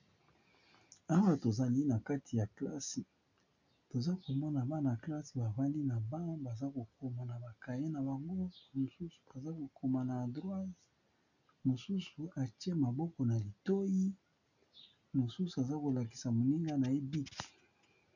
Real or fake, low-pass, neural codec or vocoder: real; 7.2 kHz; none